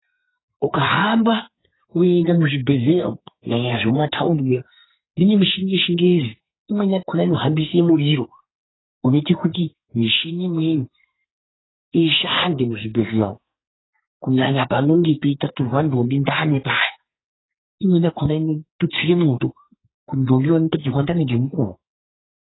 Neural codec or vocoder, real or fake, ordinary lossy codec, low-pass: codec, 44.1 kHz, 2.6 kbps, SNAC; fake; AAC, 16 kbps; 7.2 kHz